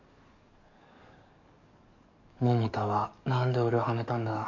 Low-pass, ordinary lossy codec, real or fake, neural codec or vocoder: 7.2 kHz; none; fake; codec, 44.1 kHz, 7.8 kbps, DAC